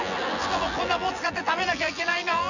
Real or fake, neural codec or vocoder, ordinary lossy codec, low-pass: fake; vocoder, 24 kHz, 100 mel bands, Vocos; none; 7.2 kHz